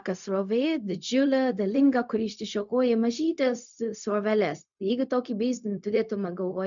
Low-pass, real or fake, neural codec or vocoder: 7.2 kHz; fake; codec, 16 kHz, 0.4 kbps, LongCat-Audio-Codec